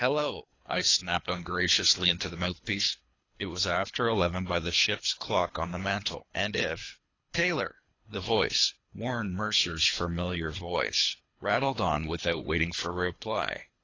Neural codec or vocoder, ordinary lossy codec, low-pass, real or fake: codec, 24 kHz, 3 kbps, HILCodec; AAC, 32 kbps; 7.2 kHz; fake